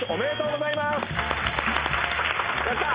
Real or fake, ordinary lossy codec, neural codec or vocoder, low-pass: real; Opus, 32 kbps; none; 3.6 kHz